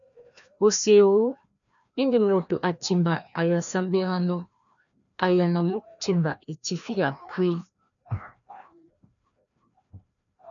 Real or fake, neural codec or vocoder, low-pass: fake; codec, 16 kHz, 1 kbps, FreqCodec, larger model; 7.2 kHz